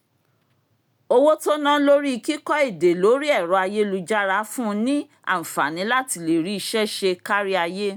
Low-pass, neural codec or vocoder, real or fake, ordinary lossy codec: none; none; real; none